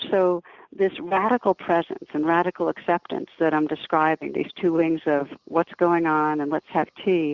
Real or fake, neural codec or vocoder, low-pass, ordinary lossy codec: real; none; 7.2 kHz; Opus, 64 kbps